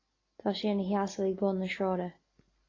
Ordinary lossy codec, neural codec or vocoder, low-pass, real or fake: AAC, 32 kbps; none; 7.2 kHz; real